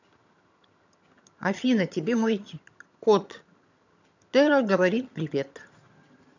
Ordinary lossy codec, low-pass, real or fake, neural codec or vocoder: none; 7.2 kHz; fake; vocoder, 22.05 kHz, 80 mel bands, HiFi-GAN